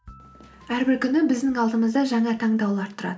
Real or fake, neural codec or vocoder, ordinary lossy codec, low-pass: real; none; none; none